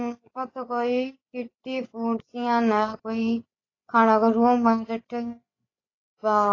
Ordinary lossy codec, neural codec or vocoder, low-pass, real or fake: AAC, 32 kbps; codec, 16 kHz, 16 kbps, FreqCodec, larger model; 7.2 kHz; fake